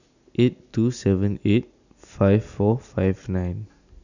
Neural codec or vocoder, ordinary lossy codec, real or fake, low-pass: none; none; real; 7.2 kHz